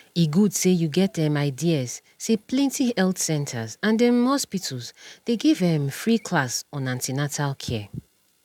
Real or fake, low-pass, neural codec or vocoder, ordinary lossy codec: real; 19.8 kHz; none; none